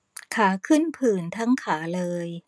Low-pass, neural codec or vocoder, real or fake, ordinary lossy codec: none; none; real; none